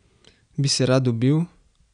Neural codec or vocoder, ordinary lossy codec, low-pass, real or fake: none; none; 9.9 kHz; real